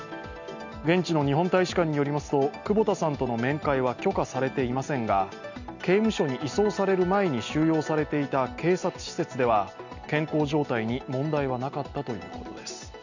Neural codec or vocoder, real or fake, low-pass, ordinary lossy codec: none; real; 7.2 kHz; none